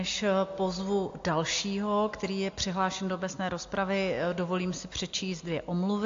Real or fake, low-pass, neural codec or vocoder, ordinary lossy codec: real; 7.2 kHz; none; AAC, 48 kbps